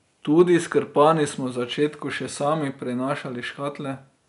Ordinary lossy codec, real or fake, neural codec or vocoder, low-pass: none; real; none; 10.8 kHz